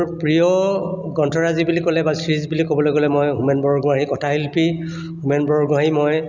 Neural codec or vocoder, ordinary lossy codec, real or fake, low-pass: none; none; real; 7.2 kHz